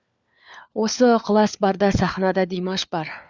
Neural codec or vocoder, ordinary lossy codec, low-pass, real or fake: codec, 16 kHz, 4 kbps, FunCodec, trained on LibriTTS, 50 frames a second; Opus, 64 kbps; 7.2 kHz; fake